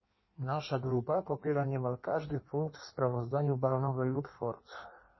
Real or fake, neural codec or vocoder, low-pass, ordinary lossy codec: fake; codec, 16 kHz in and 24 kHz out, 1.1 kbps, FireRedTTS-2 codec; 7.2 kHz; MP3, 24 kbps